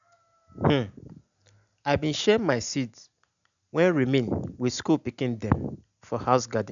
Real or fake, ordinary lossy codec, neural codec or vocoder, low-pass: real; none; none; 7.2 kHz